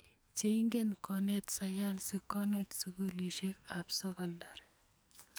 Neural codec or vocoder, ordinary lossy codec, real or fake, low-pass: codec, 44.1 kHz, 2.6 kbps, SNAC; none; fake; none